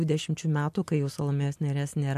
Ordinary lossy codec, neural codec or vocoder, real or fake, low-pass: MP3, 64 kbps; none; real; 14.4 kHz